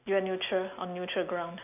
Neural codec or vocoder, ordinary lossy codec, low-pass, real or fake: none; none; 3.6 kHz; real